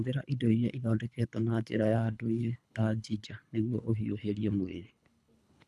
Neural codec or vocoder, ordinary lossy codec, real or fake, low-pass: codec, 24 kHz, 3 kbps, HILCodec; none; fake; none